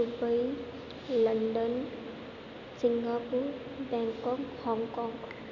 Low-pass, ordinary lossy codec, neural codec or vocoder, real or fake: 7.2 kHz; none; none; real